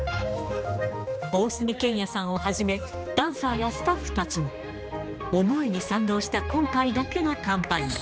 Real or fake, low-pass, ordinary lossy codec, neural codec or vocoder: fake; none; none; codec, 16 kHz, 2 kbps, X-Codec, HuBERT features, trained on general audio